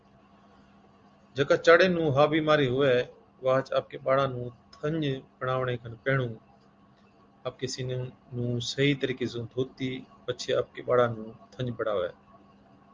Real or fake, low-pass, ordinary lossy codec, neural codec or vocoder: real; 7.2 kHz; Opus, 32 kbps; none